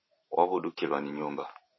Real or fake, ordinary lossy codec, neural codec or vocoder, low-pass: fake; MP3, 24 kbps; vocoder, 44.1 kHz, 128 mel bands every 512 samples, BigVGAN v2; 7.2 kHz